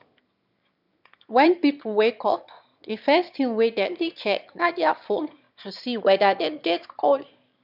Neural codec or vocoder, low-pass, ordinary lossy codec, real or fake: autoencoder, 22.05 kHz, a latent of 192 numbers a frame, VITS, trained on one speaker; 5.4 kHz; none; fake